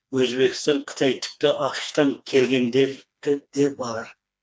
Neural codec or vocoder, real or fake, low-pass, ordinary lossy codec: codec, 16 kHz, 2 kbps, FreqCodec, smaller model; fake; none; none